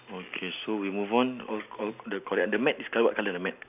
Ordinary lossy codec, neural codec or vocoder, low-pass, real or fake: none; none; 3.6 kHz; real